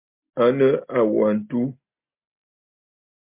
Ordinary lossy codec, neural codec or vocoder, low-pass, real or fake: MP3, 32 kbps; none; 3.6 kHz; real